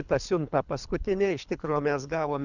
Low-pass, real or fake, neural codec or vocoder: 7.2 kHz; fake; codec, 24 kHz, 3 kbps, HILCodec